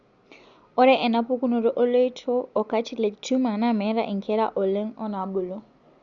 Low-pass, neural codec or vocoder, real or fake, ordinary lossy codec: 7.2 kHz; none; real; Opus, 64 kbps